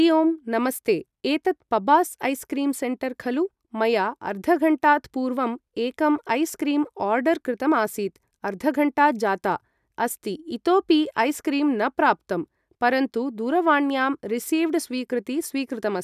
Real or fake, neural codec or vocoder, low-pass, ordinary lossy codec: real; none; 14.4 kHz; none